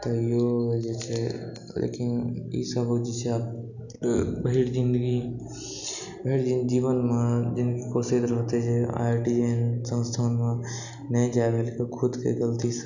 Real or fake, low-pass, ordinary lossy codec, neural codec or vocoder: real; 7.2 kHz; none; none